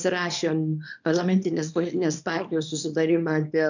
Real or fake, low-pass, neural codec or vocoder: fake; 7.2 kHz; codec, 24 kHz, 0.9 kbps, WavTokenizer, small release